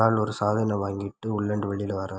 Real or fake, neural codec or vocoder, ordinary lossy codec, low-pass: real; none; none; none